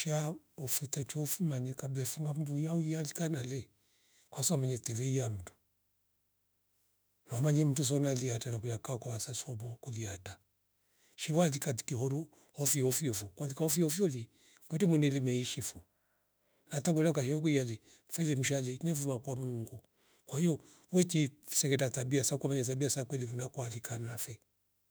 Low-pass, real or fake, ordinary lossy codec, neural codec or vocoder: none; fake; none; autoencoder, 48 kHz, 32 numbers a frame, DAC-VAE, trained on Japanese speech